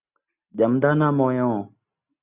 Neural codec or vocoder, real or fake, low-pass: none; real; 3.6 kHz